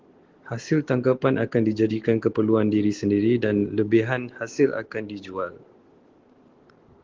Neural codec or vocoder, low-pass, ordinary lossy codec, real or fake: none; 7.2 kHz; Opus, 16 kbps; real